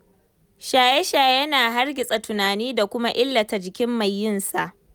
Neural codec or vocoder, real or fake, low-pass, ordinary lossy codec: none; real; none; none